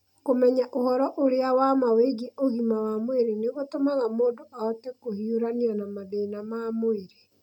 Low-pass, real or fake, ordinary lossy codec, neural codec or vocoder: 19.8 kHz; real; none; none